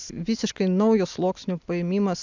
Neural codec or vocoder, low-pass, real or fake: none; 7.2 kHz; real